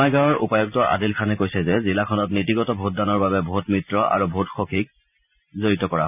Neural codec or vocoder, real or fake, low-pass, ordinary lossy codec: none; real; 3.6 kHz; none